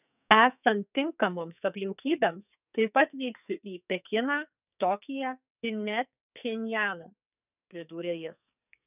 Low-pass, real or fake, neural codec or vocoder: 3.6 kHz; fake; codec, 44.1 kHz, 2.6 kbps, SNAC